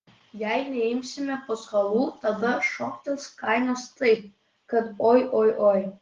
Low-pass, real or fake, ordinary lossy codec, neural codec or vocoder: 7.2 kHz; real; Opus, 16 kbps; none